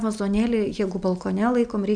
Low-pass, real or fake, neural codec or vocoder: 9.9 kHz; real; none